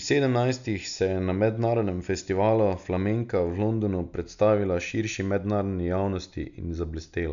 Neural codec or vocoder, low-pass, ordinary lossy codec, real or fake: none; 7.2 kHz; none; real